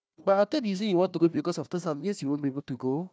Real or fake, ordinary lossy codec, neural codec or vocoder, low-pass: fake; none; codec, 16 kHz, 1 kbps, FunCodec, trained on Chinese and English, 50 frames a second; none